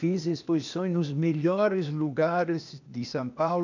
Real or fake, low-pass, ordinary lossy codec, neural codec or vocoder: fake; 7.2 kHz; none; codec, 16 kHz, 0.8 kbps, ZipCodec